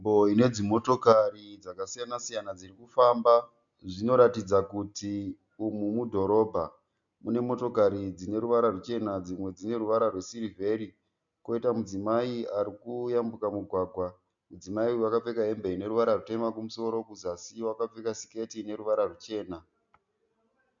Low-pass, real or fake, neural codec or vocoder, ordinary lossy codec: 7.2 kHz; real; none; MP3, 64 kbps